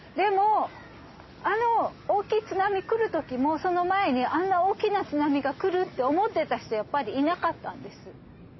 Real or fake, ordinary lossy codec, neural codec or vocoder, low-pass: real; MP3, 24 kbps; none; 7.2 kHz